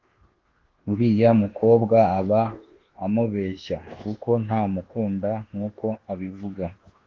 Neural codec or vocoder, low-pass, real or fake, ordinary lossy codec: codec, 24 kHz, 1.2 kbps, DualCodec; 7.2 kHz; fake; Opus, 16 kbps